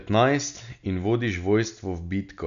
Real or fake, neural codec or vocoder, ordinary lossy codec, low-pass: real; none; none; 7.2 kHz